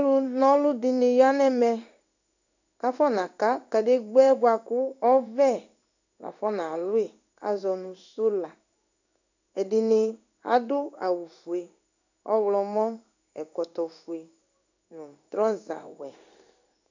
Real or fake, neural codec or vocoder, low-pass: fake; codec, 16 kHz in and 24 kHz out, 1 kbps, XY-Tokenizer; 7.2 kHz